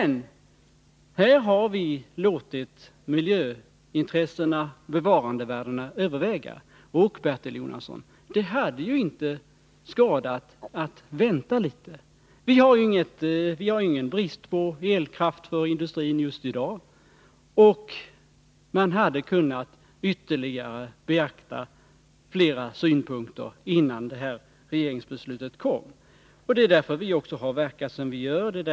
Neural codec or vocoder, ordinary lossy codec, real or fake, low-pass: none; none; real; none